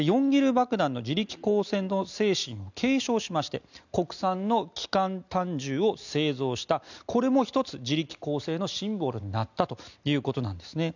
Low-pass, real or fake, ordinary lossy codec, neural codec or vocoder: 7.2 kHz; real; none; none